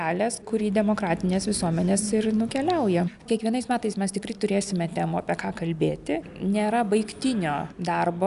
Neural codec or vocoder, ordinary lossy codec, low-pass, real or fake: none; AAC, 96 kbps; 10.8 kHz; real